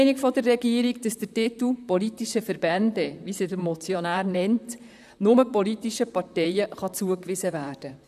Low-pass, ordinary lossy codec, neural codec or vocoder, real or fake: 14.4 kHz; none; vocoder, 44.1 kHz, 128 mel bands, Pupu-Vocoder; fake